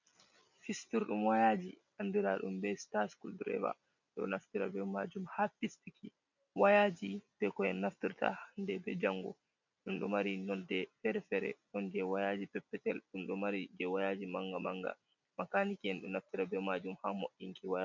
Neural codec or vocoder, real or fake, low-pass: none; real; 7.2 kHz